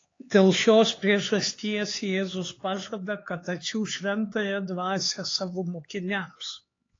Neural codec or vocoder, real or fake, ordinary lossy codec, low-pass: codec, 16 kHz, 4 kbps, X-Codec, HuBERT features, trained on LibriSpeech; fake; AAC, 32 kbps; 7.2 kHz